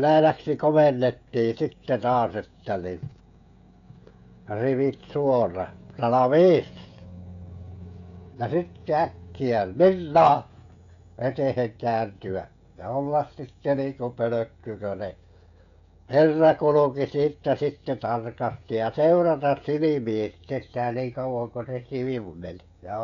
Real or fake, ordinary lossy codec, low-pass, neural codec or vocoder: fake; MP3, 96 kbps; 7.2 kHz; codec, 16 kHz, 16 kbps, FreqCodec, smaller model